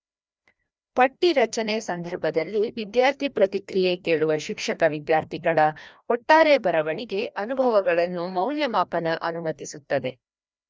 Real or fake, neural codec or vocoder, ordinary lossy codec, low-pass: fake; codec, 16 kHz, 1 kbps, FreqCodec, larger model; none; none